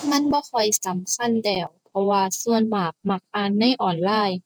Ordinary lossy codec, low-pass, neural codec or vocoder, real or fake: none; none; vocoder, 48 kHz, 128 mel bands, Vocos; fake